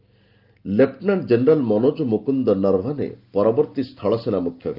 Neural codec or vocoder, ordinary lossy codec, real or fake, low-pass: none; Opus, 24 kbps; real; 5.4 kHz